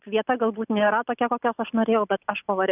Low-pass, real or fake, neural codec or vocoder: 3.6 kHz; real; none